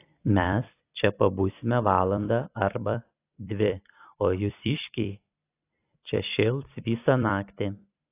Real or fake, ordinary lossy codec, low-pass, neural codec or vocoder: fake; AAC, 24 kbps; 3.6 kHz; vocoder, 44.1 kHz, 128 mel bands every 256 samples, BigVGAN v2